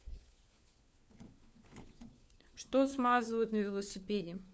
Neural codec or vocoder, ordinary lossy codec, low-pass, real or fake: codec, 16 kHz, 4 kbps, FunCodec, trained on LibriTTS, 50 frames a second; none; none; fake